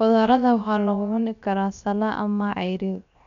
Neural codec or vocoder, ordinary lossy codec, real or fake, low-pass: codec, 16 kHz, 0.7 kbps, FocalCodec; none; fake; 7.2 kHz